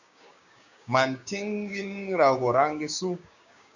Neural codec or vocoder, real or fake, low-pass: codec, 16 kHz, 6 kbps, DAC; fake; 7.2 kHz